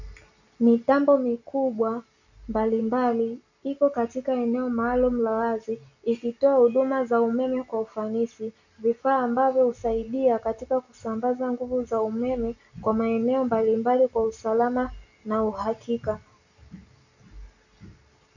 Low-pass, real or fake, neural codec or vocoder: 7.2 kHz; real; none